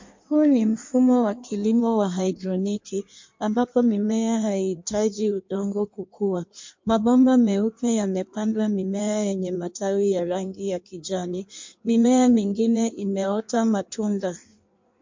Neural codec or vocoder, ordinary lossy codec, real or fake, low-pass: codec, 16 kHz in and 24 kHz out, 1.1 kbps, FireRedTTS-2 codec; MP3, 48 kbps; fake; 7.2 kHz